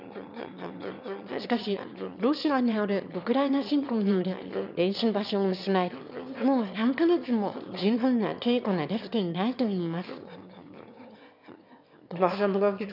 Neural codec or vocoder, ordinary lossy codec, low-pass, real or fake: autoencoder, 22.05 kHz, a latent of 192 numbers a frame, VITS, trained on one speaker; none; 5.4 kHz; fake